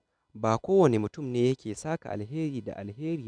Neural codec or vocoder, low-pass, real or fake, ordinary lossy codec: none; 9.9 kHz; real; none